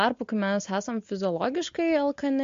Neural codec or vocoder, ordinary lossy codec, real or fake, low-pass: none; MP3, 48 kbps; real; 7.2 kHz